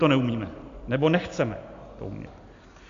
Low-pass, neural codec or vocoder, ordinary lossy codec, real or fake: 7.2 kHz; none; AAC, 64 kbps; real